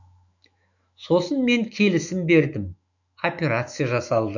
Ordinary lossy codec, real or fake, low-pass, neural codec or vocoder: none; fake; 7.2 kHz; autoencoder, 48 kHz, 128 numbers a frame, DAC-VAE, trained on Japanese speech